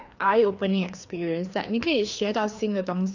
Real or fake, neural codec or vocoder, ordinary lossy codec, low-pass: fake; codec, 16 kHz, 2 kbps, FreqCodec, larger model; none; 7.2 kHz